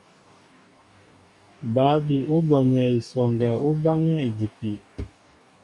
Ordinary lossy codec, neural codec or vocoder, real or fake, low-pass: MP3, 96 kbps; codec, 44.1 kHz, 2.6 kbps, DAC; fake; 10.8 kHz